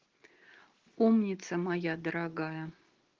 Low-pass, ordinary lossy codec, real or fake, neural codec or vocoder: 7.2 kHz; Opus, 16 kbps; real; none